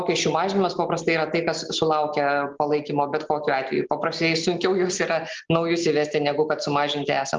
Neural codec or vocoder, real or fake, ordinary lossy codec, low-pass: none; real; Opus, 32 kbps; 7.2 kHz